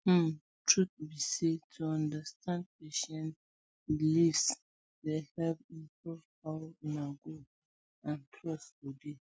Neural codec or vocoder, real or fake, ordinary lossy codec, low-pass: none; real; none; none